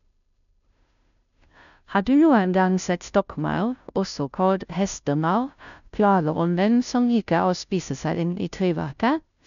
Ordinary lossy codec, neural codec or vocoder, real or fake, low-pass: none; codec, 16 kHz, 0.5 kbps, FunCodec, trained on Chinese and English, 25 frames a second; fake; 7.2 kHz